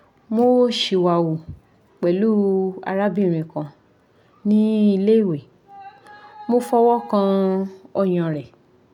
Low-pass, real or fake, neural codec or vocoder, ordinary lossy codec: 19.8 kHz; real; none; none